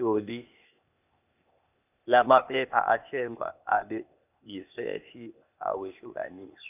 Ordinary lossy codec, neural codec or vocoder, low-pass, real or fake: none; codec, 16 kHz, 0.8 kbps, ZipCodec; 3.6 kHz; fake